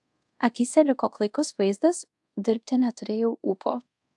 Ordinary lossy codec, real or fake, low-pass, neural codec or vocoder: AAC, 64 kbps; fake; 10.8 kHz; codec, 24 kHz, 0.5 kbps, DualCodec